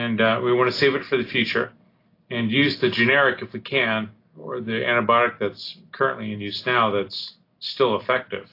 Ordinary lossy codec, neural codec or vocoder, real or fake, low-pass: AAC, 32 kbps; none; real; 5.4 kHz